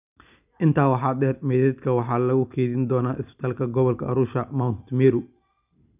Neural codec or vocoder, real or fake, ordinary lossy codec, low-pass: none; real; none; 3.6 kHz